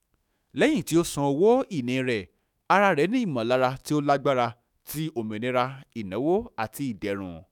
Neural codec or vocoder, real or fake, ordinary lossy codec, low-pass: autoencoder, 48 kHz, 128 numbers a frame, DAC-VAE, trained on Japanese speech; fake; none; 19.8 kHz